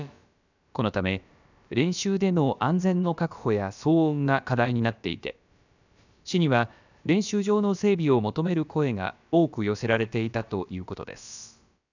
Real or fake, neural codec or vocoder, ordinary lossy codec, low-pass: fake; codec, 16 kHz, about 1 kbps, DyCAST, with the encoder's durations; none; 7.2 kHz